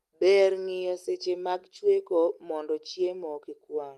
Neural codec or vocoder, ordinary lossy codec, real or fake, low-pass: none; Opus, 32 kbps; real; 14.4 kHz